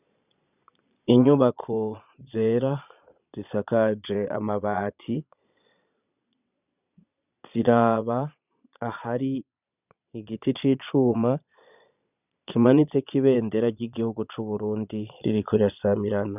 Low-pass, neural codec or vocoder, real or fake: 3.6 kHz; vocoder, 22.05 kHz, 80 mel bands, Vocos; fake